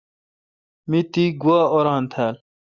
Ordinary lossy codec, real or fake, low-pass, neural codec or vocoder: Opus, 64 kbps; real; 7.2 kHz; none